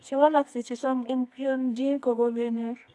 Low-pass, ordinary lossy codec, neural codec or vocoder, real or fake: none; none; codec, 24 kHz, 0.9 kbps, WavTokenizer, medium music audio release; fake